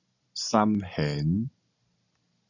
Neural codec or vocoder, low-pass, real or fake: none; 7.2 kHz; real